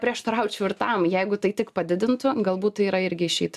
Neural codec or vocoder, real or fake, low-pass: none; real; 14.4 kHz